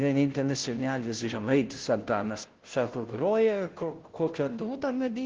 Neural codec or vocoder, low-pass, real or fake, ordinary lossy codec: codec, 16 kHz, 0.5 kbps, FunCodec, trained on Chinese and English, 25 frames a second; 7.2 kHz; fake; Opus, 16 kbps